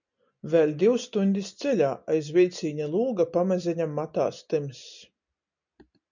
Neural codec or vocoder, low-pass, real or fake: none; 7.2 kHz; real